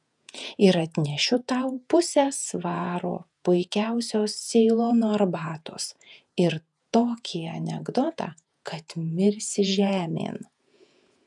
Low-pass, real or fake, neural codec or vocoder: 10.8 kHz; fake; vocoder, 48 kHz, 128 mel bands, Vocos